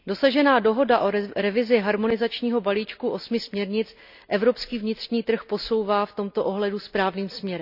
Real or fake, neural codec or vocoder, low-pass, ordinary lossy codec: real; none; 5.4 kHz; none